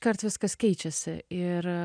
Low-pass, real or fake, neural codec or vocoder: 9.9 kHz; real; none